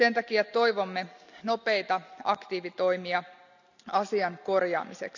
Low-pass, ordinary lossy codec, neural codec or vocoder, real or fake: 7.2 kHz; none; none; real